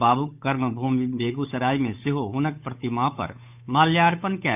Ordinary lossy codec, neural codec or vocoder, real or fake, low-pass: none; codec, 16 kHz, 8 kbps, FunCodec, trained on Chinese and English, 25 frames a second; fake; 3.6 kHz